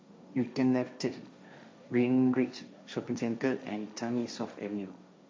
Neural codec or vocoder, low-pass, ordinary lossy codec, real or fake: codec, 16 kHz, 1.1 kbps, Voila-Tokenizer; none; none; fake